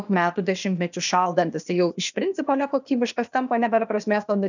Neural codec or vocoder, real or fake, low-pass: codec, 16 kHz, 0.8 kbps, ZipCodec; fake; 7.2 kHz